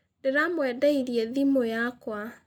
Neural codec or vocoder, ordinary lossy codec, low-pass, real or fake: none; none; 19.8 kHz; real